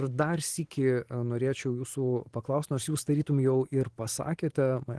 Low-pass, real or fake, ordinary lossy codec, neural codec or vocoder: 10.8 kHz; fake; Opus, 16 kbps; vocoder, 44.1 kHz, 128 mel bands every 512 samples, BigVGAN v2